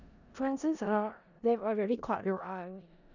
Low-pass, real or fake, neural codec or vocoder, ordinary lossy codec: 7.2 kHz; fake; codec, 16 kHz in and 24 kHz out, 0.4 kbps, LongCat-Audio-Codec, four codebook decoder; none